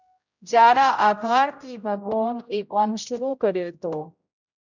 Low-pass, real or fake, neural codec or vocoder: 7.2 kHz; fake; codec, 16 kHz, 0.5 kbps, X-Codec, HuBERT features, trained on general audio